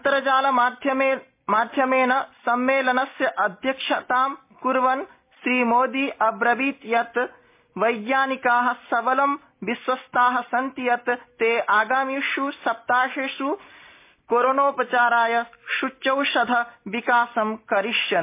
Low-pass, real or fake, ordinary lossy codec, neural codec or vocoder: 3.6 kHz; real; MP3, 24 kbps; none